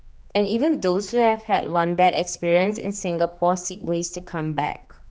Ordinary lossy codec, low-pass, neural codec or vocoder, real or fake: none; none; codec, 16 kHz, 2 kbps, X-Codec, HuBERT features, trained on general audio; fake